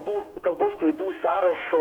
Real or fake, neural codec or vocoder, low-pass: fake; codec, 44.1 kHz, 2.6 kbps, DAC; 19.8 kHz